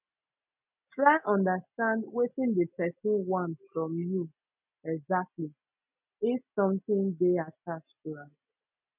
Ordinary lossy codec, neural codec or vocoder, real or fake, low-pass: none; none; real; 3.6 kHz